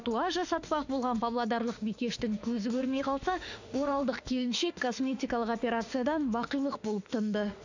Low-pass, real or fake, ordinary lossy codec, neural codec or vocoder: 7.2 kHz; fake; none; autoencoder, 48 kHz, 32 numbers a frame, DAC-VAE, trained on Japanese speech